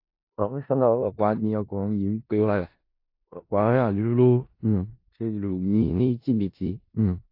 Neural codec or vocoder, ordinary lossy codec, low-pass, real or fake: codec, 16 kHz in and 24 kHz out, 0.4 kbps, LongCat-Audio-Codec, four codebook decoder; AAC, 32 kbps; 5.4 kHz; fake